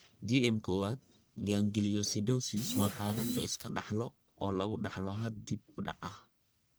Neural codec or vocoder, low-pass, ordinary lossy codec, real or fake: codec, 44.1 kHz, 1.7 kbps, Pupu-Codec; none; none; fake